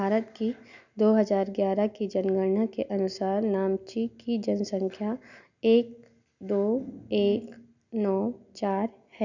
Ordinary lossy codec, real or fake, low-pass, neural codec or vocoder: none; real; 7.2 kHz; none